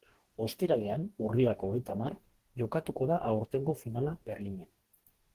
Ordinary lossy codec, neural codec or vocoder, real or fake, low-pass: Opus, 16 kbps; codec, 44.1 kHz, 2.6 kbps, DAC; fake; 14.4 kHz